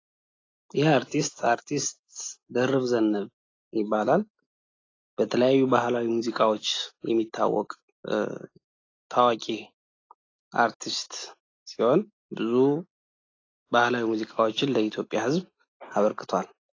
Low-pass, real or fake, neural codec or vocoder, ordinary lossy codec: 7.2 kHz; real; none; AAC, 32 kbps